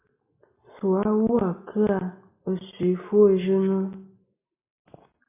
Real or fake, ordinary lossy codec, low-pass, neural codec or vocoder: real; MP3, 24 kbps; 3.6 kHz; none